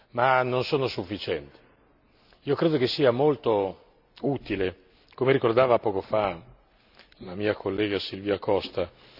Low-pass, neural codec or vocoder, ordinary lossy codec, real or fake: 5.4 kHz; none; none; real